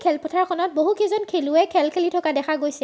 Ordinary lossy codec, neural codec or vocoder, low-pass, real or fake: none; none; none; real